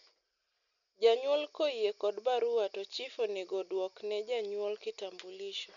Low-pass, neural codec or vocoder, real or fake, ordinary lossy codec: 7.2 kHz; none; real; none